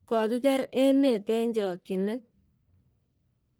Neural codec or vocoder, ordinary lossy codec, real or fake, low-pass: codec, 44.1 kHz, 1.7 kbps, Pupu-Codec; none; fake; none